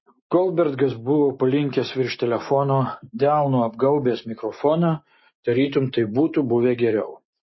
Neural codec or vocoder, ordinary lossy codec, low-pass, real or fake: none; MP3, 24 kbps; 7.2 kHz; real